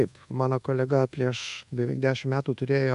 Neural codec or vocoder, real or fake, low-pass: codec, 24 kHz, 1.2 kbps, DualCodec; fake; 10.8 kHz